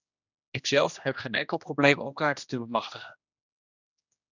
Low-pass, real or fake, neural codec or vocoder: 7.2 kHz; fake; codec, 16 kHz, 1 kbps, X-Codec, HuBERT features, trained on general audio